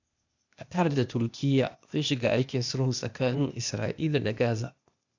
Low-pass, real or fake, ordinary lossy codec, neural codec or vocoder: 7.2 kHz; fake; none; codec, 16 kHz, 0.8 kbps, ZipCodec